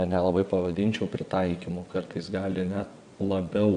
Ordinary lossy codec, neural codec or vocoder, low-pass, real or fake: AAC, 64 kbps; vocoder, 22.05 kHz, 80 mel bands, WaveNeXt; 9.9 kHz; fake